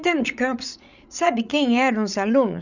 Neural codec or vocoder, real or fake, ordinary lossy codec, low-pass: codec, 16 kHz, 8 kbps, FreqCodec, larger model; fake; none; 7.2 kHz